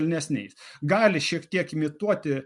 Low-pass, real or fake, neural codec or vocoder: 10.8 kHz; real; none